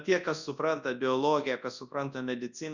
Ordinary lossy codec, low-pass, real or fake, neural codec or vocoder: Opus, 64 kbps; 7.2 kHz; fake; codec, 24 kHz, 0.9 kbps, WavTokenizer, large speech release